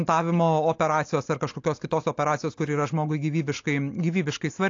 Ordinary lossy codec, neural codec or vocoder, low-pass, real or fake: AAC, 48 kbps; none; 7.2 kHz; real